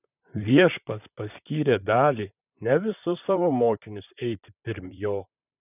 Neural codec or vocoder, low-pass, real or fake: vocoder, 44.1 kHz, 128 mel bands, Pupu-Vocoder; 3.6 kHz; fake